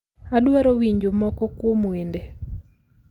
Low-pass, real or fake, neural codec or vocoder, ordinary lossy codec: 19.8 kHz; real; none; Opus, 32 kbps